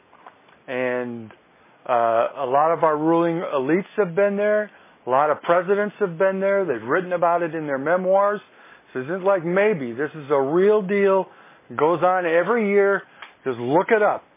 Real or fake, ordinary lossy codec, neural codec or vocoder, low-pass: real; MP3, 16 kbps; none; 3.6 kHz